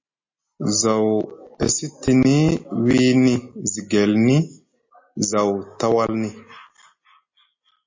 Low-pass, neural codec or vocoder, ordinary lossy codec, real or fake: 7.2 kHz; none; MP3, 32 kbps; real